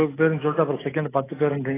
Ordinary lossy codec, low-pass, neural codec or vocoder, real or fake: AAC, 16 kbps; 3.6 kHz; none; real